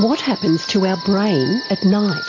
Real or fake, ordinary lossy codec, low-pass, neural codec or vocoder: real; AAC, 32 kbps; 7.2 kHz; none